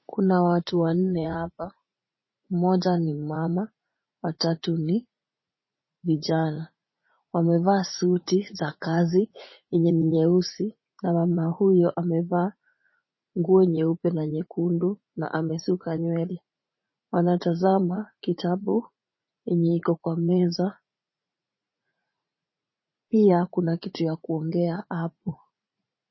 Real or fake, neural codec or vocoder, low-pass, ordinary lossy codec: fake; vocoder, 44.1 kHz, 80 mel bands, Vocos; 7.2 kHz; MP3, 24 kbps